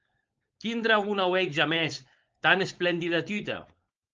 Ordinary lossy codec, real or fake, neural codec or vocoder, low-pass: Opus, 24 kbps; fake; codec, 16 kHz, 4.8 kbps, FACodec; 7.2 kHz